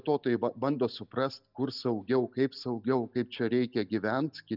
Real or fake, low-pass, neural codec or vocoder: real; 5.4 kHz; none